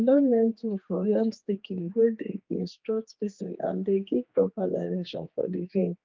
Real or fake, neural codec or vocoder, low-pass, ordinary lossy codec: fake; codec, 16 kHz, 2 kbps, X-Codec, HuBERT features, trained on general audio; 7.2 kHz; Opus, 24 kbps